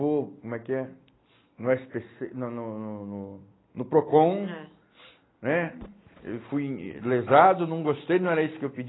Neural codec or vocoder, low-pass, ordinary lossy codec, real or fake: none; 7.2 kHz; AAC, 16 kbps; real